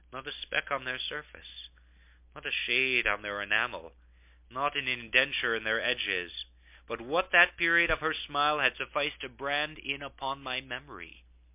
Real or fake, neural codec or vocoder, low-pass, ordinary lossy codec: real; none; 3.6 kHz; MP3, 32 kbps